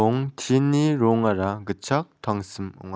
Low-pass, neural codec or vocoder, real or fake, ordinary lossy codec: none; none; real; none